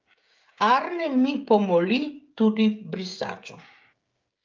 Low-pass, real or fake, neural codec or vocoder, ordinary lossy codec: 7.2 kHz; fake; codec, 16 kHz, 16 kbps, FreqCodec, smaller model; Opus, 32 kbps